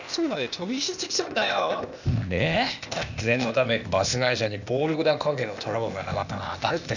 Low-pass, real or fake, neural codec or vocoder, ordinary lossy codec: 7.2 kHz; fake; codec, 16 kHz, 0.8 kbps, ZipCodec; none